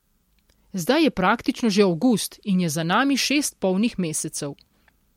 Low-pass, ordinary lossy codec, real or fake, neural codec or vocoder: 19.8 kHz; MP3, 64 kbps; real; none